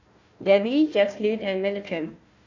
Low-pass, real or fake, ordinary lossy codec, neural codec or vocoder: 7.2 kHz; fake; AAC, 48 kbps; codec, 16 kHz, 1 kbps, FunCodec, trained on Chinese and English, 50 frames a second